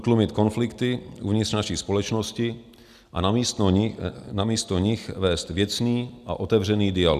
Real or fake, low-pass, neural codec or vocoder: real; 14.4 kHz; none